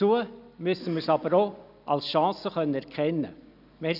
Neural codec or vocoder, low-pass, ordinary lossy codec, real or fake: none; 5.4 kHz; none; real